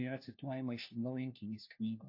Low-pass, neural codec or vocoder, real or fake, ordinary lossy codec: 5.4 kHz; codec, 16 kHz, 1 kbps, FunCodec, trained on LibriTTS, 50 frames a second; fake; AAC, 48 kbps